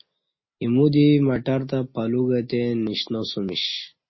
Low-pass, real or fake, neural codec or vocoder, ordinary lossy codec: 7.2 kHz; real; none; MP3, 24 kbps